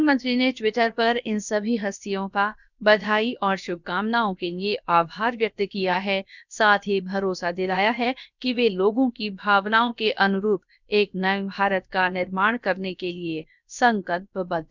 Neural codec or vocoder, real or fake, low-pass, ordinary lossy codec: codec, 16 kHz, about 1 kbps, DyCAST, with the encoder's durations; fake; 7.2 kHz; none